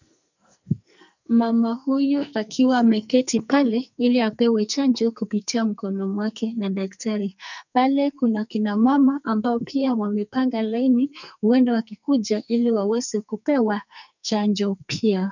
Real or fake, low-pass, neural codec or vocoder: fake; 7.2 kHz; codec, 44.1 kHz, 2.6 kbps, SNAC